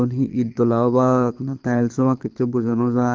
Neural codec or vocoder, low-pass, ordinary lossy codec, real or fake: codec, 24 kHz, 6 kbps, HILCodec; 7.2 kHz; Opus, 24 kbps; fake